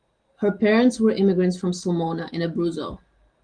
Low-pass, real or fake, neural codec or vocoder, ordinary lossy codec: 9.9 kHz; real; none; Opus, 24 kbps